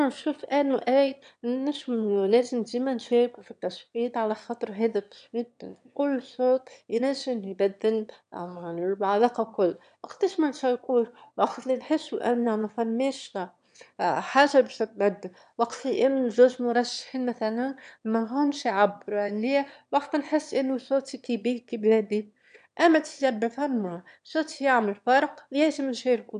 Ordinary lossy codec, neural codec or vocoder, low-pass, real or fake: MP3, 96 kbps; autoencoder, 22.05 kHz, a latent of 192 numbers a frame, VITS, trained on one speaker; 9.9 kHz; fake